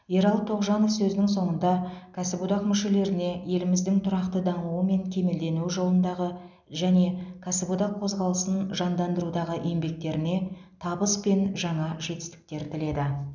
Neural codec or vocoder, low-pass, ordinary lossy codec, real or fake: none; 7.2 kHz; none; real